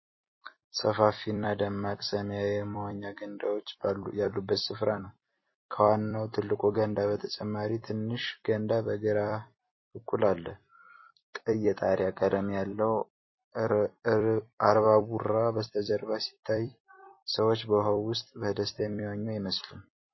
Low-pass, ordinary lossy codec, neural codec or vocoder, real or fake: 7.2 kHz; MP3, 24 kbps; vocoder, 44.1 kHz, 128 mel bands every 256 samples, BigVGAN v2; fake